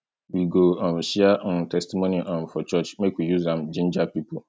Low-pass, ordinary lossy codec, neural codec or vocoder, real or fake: none; none; none; real